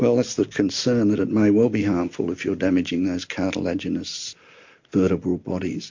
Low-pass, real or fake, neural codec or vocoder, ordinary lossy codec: 7.2 kHz; real; none; MP3, 48 kbps